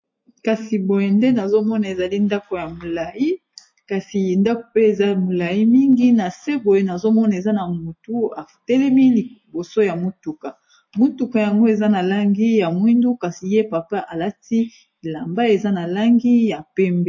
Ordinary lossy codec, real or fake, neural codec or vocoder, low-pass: MP3, 32 kbps; real; none; 7.2 kHz